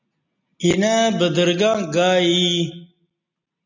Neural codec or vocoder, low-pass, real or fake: none; 7.2 kHz; real